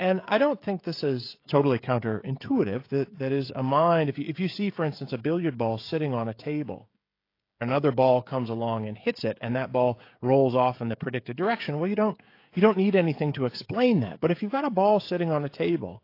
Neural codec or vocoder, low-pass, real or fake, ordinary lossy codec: codec, 16 kHz, 16 kbps, FreqCodec, smaller model; 5.4 kHz; fake; AAC, 32 kbps